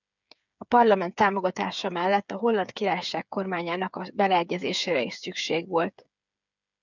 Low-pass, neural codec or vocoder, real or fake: 7.2 kHz; codec, 16 kHz, 8 kbps, FreqCodec, smaller model; fake